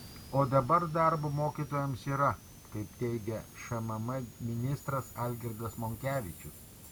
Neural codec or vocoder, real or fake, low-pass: none; real; 19.8 kHz